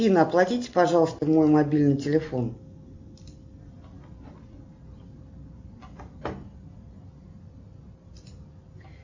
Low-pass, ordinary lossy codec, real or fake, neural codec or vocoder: 7.2 kHz; MP3, 48 kbps; real; none